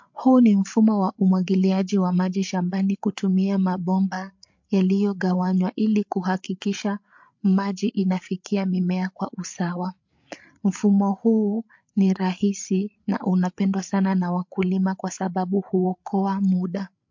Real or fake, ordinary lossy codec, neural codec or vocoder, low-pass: fake; MP3, 48 kbps; codec, 16 kHz, 8 kbps, FreqCodec, larger model; 7.2 kHz